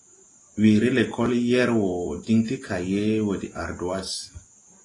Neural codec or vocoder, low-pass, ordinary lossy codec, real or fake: none; 10.8 kHz; AAC, 32 kbps; real